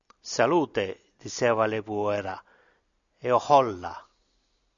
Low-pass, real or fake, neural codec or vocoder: 7.2 kHz; real; none